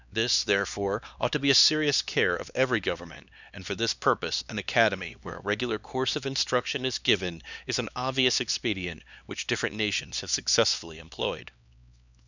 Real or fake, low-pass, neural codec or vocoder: fake; 7.2 kHz; codec, 16 kHz, 2 kbps, X-Codec, HuBERT features, trained on LibriSpeech